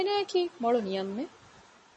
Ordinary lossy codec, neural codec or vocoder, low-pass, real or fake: MP3, 32 kbps; none; 10.8 kHz; real